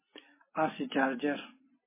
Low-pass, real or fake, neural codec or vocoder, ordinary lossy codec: 3.6 kHz; real; none; MP3, 16 kbps